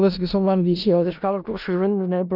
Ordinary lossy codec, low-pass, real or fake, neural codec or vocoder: none; 5.4 kHz; fake; codec, 16 kHz in and 24 kHz out, 0.4 kbps, LongCat-Audio-Codec, four codebook decoder